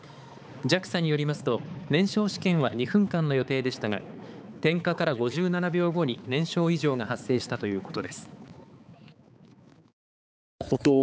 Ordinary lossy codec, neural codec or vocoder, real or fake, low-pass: none; codec, 16 kHz, 4 kbps, X-Codec, HuBERT features, trained on balanced general audio; fake; none